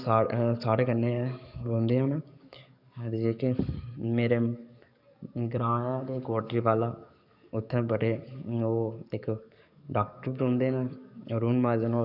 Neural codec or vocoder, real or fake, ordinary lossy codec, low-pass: codec, 44.1 kHz, 7.8 kbps, DAC; fake; none; 5.4 kHz